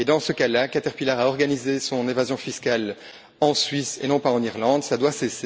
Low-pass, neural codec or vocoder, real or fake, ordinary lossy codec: none; none; real; none